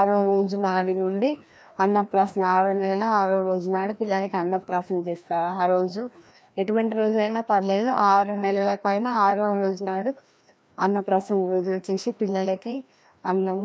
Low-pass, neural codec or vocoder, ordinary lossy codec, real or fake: none; codec, 16 kHz, 1 kbps, FreqCodec, larger model; none; fake